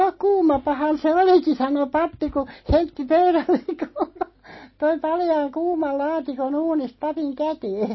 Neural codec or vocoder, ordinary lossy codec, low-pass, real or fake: none; MP3, 24 kbps; 7.2 kHz; real